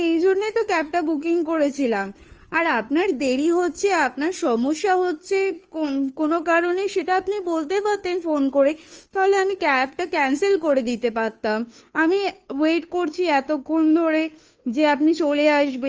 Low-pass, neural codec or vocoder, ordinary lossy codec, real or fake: 7.2 kHz; codec, 16 kHz, 2 kbps, FunCodec, trained on Chinese and English, 25 frames a second; Opus, 24 kbps; fake